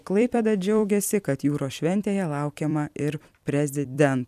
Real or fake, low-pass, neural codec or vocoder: fake; 14.4 kHz; vocoder, 44.1 kHz, 128 mel bands every 256 samples, BigVGAN v2